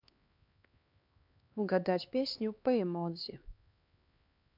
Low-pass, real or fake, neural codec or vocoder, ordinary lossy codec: 5.4 kHz; fake; codec, 16 kHz, 2 kbps, X-Codec, HuBERT features, trained on LibriSpeech; MP3, 48 kbps